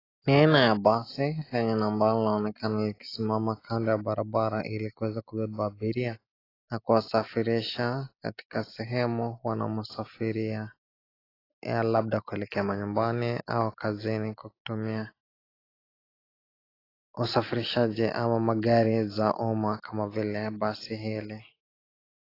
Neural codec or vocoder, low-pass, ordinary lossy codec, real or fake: none; 5.4 kHz; AAC, 24 kbps; real